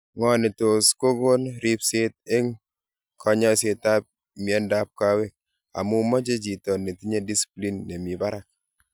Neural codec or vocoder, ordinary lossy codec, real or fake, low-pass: none; none; real; none